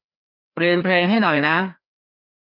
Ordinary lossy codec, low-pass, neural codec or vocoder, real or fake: none; 5.4 kHz; codec, 16 kHz, 2 kbps, FreqCodec, larger model; fake